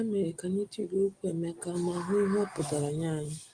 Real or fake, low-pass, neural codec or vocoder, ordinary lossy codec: real; 9.9 kHz; none; Opus, 24 kbps